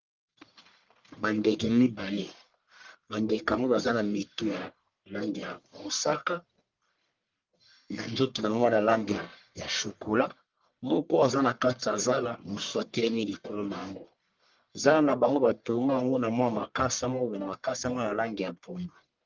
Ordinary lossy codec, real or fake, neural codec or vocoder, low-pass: Opus, 32 kbps; fake; codec, 44.1 kHz, 1.7 kbps, Pupu-Codec; 7.2 kHz